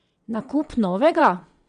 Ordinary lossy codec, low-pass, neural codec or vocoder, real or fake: none; 9.9 kHz; vocoder, 22.05 kHz, 80 mel bands, WaveNeXt; fake